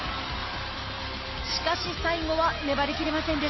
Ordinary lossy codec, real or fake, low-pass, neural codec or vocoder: MP3, 24 kbps; real; 7.2 kHz; none